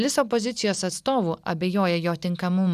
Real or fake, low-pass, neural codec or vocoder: real; 14.4 kHz; none